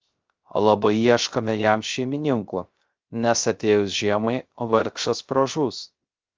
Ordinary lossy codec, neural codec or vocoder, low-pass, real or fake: Opus, 24 kbps; codec, 16 kHz, 0.3 kbps, FocalCodec; 7.2 kHz; fake